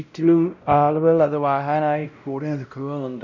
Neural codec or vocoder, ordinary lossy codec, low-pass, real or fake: codec, 16 kHz, 0.5 kbps, X-Codec, WavLM features, trained on Multilingual LibriSpeech; none; 7.2 kHz; fake